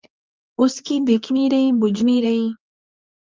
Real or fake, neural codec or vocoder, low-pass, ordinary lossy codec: fake; codec, 24 kHz, 0.9 kbps, WavTokenizer, medium speech release version 2; 7.2 kHz; Opus, 32 kbps